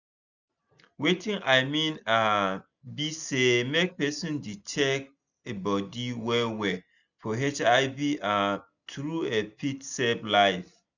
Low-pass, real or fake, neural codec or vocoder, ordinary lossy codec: 7.2 kHz; real; none; none